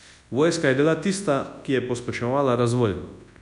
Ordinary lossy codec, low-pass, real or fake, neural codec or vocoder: none; 10.8 kHz; fake; codec, 24 kHz, 0.9 kbps, WavTokenizer, large speech release